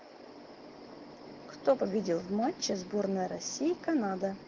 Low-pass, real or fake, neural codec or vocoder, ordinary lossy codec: 7.2 kHz; real; none; Opus, 16 kbps